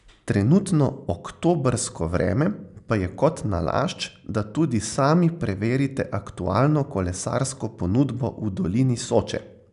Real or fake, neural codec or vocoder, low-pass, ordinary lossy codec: real; none; 10.8 kHz; none